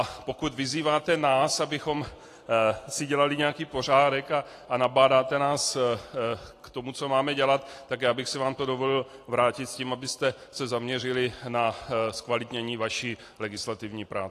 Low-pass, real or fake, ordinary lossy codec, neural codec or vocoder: 14.4 kHz; real; AAC, 48 kbps; none